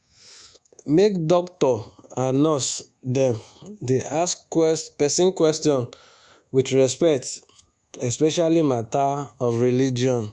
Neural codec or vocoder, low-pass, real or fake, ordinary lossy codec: codec, 24 kHz, 1.2 kbps, DualCodec; 10.8 kHz; fake; Opus, 64 kbps